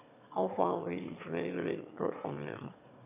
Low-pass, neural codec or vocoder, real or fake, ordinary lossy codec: 3.6 kHz; autoencoder, 22.05 kHz, a latent of 192 numbers a frame, VITS, trained on one speaker; fake; none